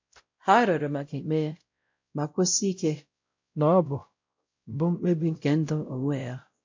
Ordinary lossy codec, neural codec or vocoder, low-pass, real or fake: MP3, 48 kbps; codec, 16 kHz, 0.5 kbps, X-Codec, WavLM features, trained on Multilingual LibriSpeech; 7.2 kHz; fake